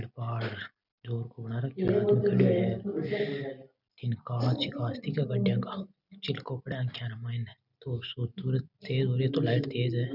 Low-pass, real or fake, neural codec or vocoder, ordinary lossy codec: 5.4 kHz; real; none; none